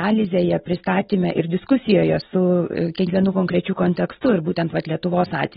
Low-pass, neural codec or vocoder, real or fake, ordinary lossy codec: 19.8 kHz; none; real; AAC, 16 kbps